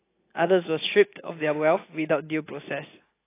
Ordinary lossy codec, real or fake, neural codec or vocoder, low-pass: AAC, 24 kbps; real; none; 3.6 kHz